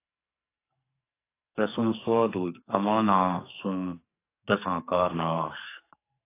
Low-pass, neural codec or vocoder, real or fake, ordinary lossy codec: 3.6 kHz; codec, 44.1 kHz, 2.6 kbps, SNAC; fake; AAC, 24 kbps